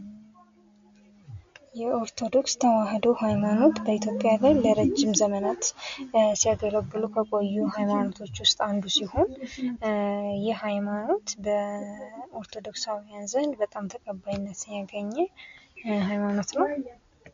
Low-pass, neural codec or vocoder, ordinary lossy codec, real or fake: 7.2 kHz; none; MP3, 48 kbps; real